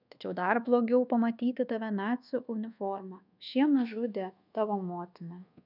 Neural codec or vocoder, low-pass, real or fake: codec, 24 kHz, 1.2 kbps, DualCodec; 5.4 kHz; fake